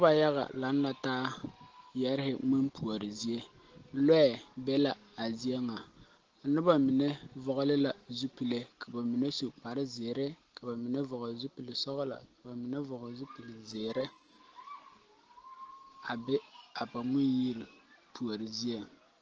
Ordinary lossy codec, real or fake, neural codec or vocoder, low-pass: Opus, 16 kbps; real; none; 7.2 kHz